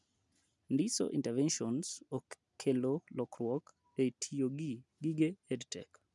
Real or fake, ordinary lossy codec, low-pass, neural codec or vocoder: real; none; 10.8 kHz; none